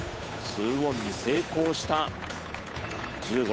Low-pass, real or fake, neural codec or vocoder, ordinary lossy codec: none; fake; codec, 16 kHz, 8 kbps, FunCodec, trained on Chinese and English, 25 frames a second; none